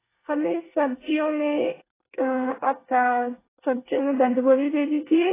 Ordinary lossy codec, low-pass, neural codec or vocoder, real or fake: AAC, 16 kbps; 3.6 kHz; codec, 24 kHz, 1 kbps, SNAC; fake